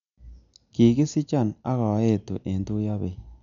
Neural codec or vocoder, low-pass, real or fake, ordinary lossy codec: none; 7.2 kHz; real; none